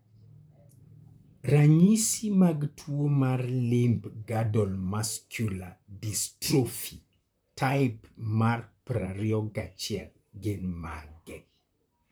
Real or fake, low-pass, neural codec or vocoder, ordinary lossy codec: fake; none; vocoder, 44.1 kHz, 128 mel bands, Pupu-Vocoder; none